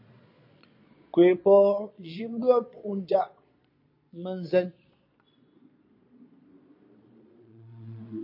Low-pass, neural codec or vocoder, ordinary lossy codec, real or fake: 5.4 kHz; vocoder, 22.05 kHz, 80 mel bands, Vocos; MP3, 32 kbps; fake